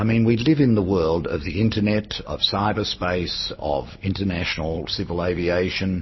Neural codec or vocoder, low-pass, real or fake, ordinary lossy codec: none; 7.2 kHz; real; MP3, 24 kbps